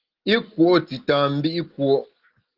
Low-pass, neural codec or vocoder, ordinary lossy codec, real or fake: 5.4 kHz; none; Opus, 16 kbps; real